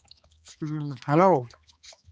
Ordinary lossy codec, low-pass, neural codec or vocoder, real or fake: none; none; codec, 16 kHz, 2 kbps, X-Codec, HuBERT features, trained on balanced general audio; fake